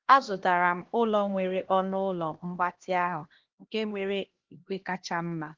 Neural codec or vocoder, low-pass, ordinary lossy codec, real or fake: codec, 16 kHz, 1 kbps, X-Codec, HuBERT features, trained on LibriSpeech; 7.2 kHz; Opus, 16 kbps; fake